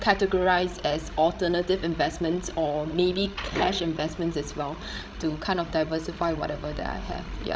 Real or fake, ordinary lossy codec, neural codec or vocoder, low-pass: fake; none; codec, 16 kHz, 16 kbps, FreqCodec, larger model; none